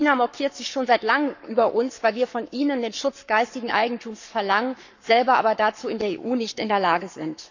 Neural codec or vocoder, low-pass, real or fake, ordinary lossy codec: codec, 44.1 kHz, 7.8 kbps, Pupu-Codec; 7.2 kHz; fake; none